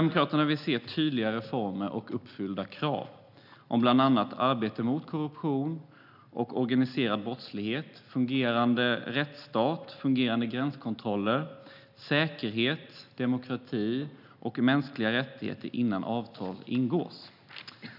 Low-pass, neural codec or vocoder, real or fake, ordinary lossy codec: 5.4 kHz; none; real; none